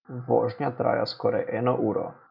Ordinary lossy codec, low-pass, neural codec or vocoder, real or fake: none; 5.4 kHz; none; real